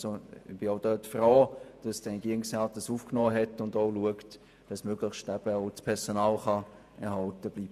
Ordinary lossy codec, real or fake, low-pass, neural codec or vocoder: none; fake; 14.4 kHz; vocoder, 48 kHz, 128 mel bands, Vocos